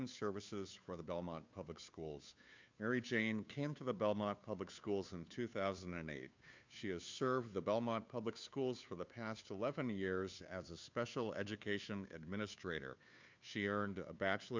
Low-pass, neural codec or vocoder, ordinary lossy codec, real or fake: 7.2 kHz; codec, 16 kHz, 2 kbps, FunCodec, trained on Chinese and English, 25 frames a second; MP3, 64 kbps; fake